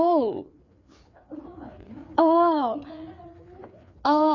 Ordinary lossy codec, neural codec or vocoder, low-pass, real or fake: none; codec, 16 kHz, 4 kbps, FreqCodec, larger model; 7.2 kHz; fake